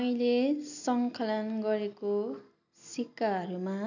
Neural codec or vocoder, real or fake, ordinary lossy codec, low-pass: none; real; none; 7.2 kHz